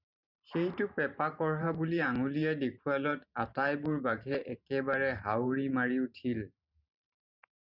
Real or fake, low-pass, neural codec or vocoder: fake; 5.4 kHz; vocoder, 44.1 kHz, 128 mel bands every 512 samples, BigVGAN v2